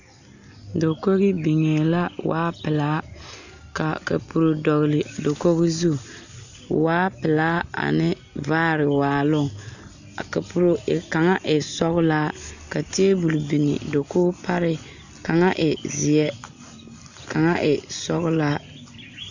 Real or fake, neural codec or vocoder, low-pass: real; none; 7.2 kHz